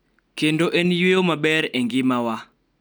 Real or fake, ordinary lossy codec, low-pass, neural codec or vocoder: real; none; none; none